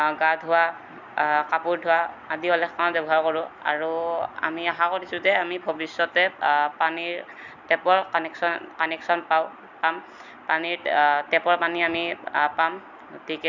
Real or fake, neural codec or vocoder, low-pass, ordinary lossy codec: real; none; 7.2 kHz; none